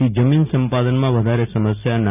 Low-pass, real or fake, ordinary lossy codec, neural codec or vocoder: 3.6 kHz; real; none; none